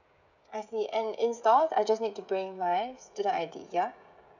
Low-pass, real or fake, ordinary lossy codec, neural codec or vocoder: 7.2 kHz; fake; none; codec, 16 kHz, 16 kbps, FreqCodec, smaller model